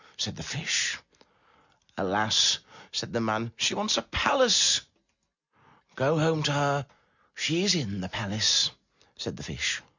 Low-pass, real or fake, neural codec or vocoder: 7.2 kHz; real; none